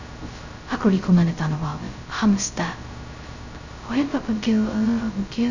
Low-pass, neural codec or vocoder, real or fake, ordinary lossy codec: 7.2 kHz; codec, 16 kHz, 0.2 kbps, FocalCodec; fake; none